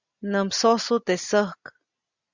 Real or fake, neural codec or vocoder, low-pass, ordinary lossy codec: real; none; 7.2 kHz; Opus, 64 kbps